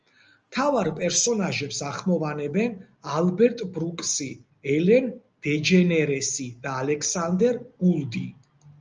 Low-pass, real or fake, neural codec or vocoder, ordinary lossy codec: 7.2 kHz; real; none; Opus, 24 kbps